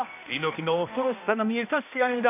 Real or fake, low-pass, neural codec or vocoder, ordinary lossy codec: fake; 3.6 kHz; codec, 16 kHz, 1 kbps, X-Codec, HuBERT features, trained on balanced general audio; MP3, 32 kbps